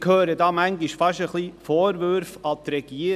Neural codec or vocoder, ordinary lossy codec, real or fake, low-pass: none; none; real; 14.4 kHz